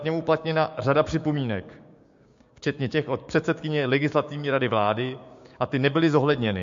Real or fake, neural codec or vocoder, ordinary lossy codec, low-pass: fake; codec, 16 kHz, 6 kbps, DAC; MP3, 48 kbps; 7.2 kHz